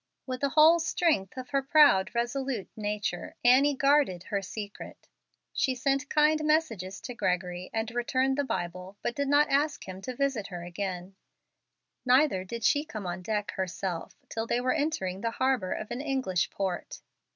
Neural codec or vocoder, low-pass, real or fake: none; 7.2 kHz; real